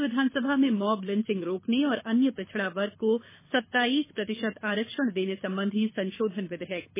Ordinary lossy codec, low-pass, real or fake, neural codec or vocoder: MP3, 16 kbps; 3.6 kHz; fake; codec, 24 kHz, 6 kbps, HILCodec